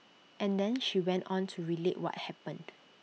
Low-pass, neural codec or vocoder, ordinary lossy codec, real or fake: none; none; none; real